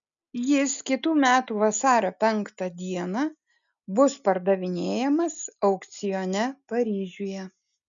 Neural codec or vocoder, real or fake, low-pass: none; real; 7.2 kHz